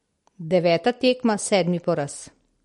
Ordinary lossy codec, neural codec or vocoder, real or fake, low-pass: MP3, 48 kbps; none; real; 19.8 kHz